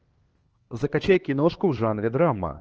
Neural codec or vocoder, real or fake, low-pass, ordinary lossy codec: codec, 16 kHz, 2 kbps, X-Codec, HuBERT features, trained on LibriSpeech; fake; 7.2 kHz; Opus, 16 kbps